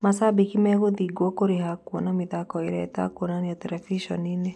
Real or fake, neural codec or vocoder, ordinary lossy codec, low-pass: real; none; none; none